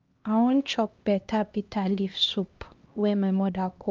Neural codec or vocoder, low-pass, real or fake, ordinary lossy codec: codec, 16 kHz, 1 kbps, X-Codec, HuBERT features, trained on LibriSpeech; 7.2 kHz; fake; Opus, 24 kbps